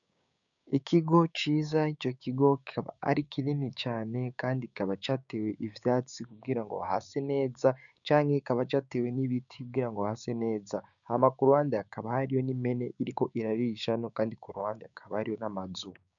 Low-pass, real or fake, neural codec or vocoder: 7.2 kHz; fake; codec, 16 kHz, 6 kbps, DAC